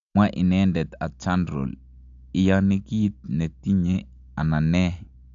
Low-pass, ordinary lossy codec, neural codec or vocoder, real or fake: 7.2 kHz; AAC, 64 kbps; none; real